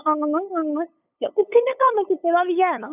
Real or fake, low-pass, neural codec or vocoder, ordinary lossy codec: fake; 3.6 kHz; codec, 16 kHz, 8 kbps, FunCodec, trained on LibriTTS, 25 frames a second; none